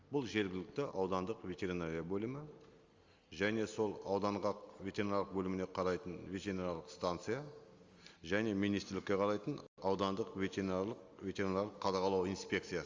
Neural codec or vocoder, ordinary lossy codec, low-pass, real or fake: none; Opus, 32 kbps; 7.2 kHz; real